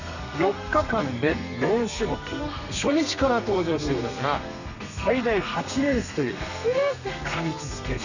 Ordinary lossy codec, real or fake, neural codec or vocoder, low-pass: none; fake; codec, 32 kHz, 1.9 kbps, SNAC; 7.2 kHz